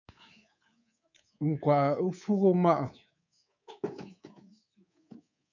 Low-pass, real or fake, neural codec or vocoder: 7.2 kHz; fake; codec, 24 kHz, 3.1 kbps, DualCodec